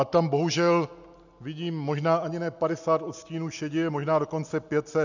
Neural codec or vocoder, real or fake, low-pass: none; real; 7.2 kHz